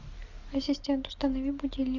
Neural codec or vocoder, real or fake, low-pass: none; real; 7.2 kHz